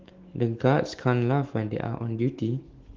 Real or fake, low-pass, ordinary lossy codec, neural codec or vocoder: fake; 7.2 kHz; Opus, 16 kbps; autoencoder, 48 kHz, 128 numbers a frame, DAC-VAE, trained on Japanese speech